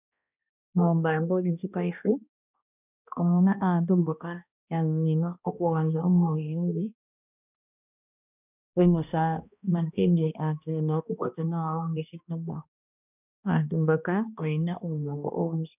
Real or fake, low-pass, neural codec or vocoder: fake; 3.6 kHz; codec, 16 kHz, 1 kbps, X-Codec, HuBERT features, trained on balanced general audio